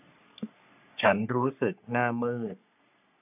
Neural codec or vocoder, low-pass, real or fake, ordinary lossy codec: codec, 44.1 kHz, 3.4 kbps, Pupu-Codec; 3.6 kHz; fake; none